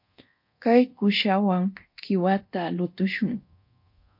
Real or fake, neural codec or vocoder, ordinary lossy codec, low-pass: fake; codec, 24 kHz, 0.9 kbps, DualCodec; MP3, 32 kbps; 5.4 kHz